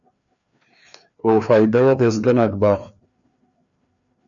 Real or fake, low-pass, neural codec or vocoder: fake; 7.2 kHz; codec, 16 kHz, 2 kbps, FreqCodec, larger model